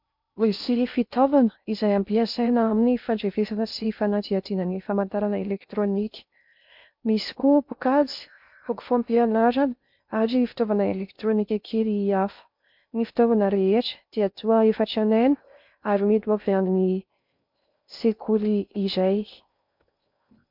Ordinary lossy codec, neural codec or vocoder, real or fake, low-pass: MP3, 48 kbps; codec, 16 kHz in and 24 kHz out, 0.6 kbps, FocalCodec, streaming, 2048 codes; fake; 5.4 kHz